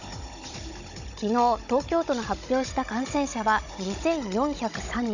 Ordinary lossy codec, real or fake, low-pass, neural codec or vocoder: none; fake; 7.2 kHz; codec, 16 kHz, 16 kbps, FunCodec, trained on Chinese and English, 50 frames a second